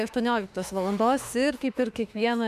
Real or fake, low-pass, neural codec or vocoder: fake; 14.4 kHz; autoencoder, 48 kHz, 32 numbers a frame, DAC-VAE, trained on Japanese speech